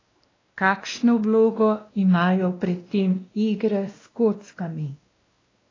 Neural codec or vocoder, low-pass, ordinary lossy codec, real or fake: codec, 16 kHz, 1 kbps, X-Codec, WavLM features, trained on Multilingual LibriSpeech; 7.2 kHz; AAC, 32 kbps; fake